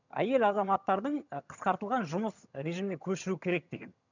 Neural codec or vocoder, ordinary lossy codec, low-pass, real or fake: vocoder, 22.05 kHz, 80 mel bands, HiFi-GAN; none; 7.2 kHz; fake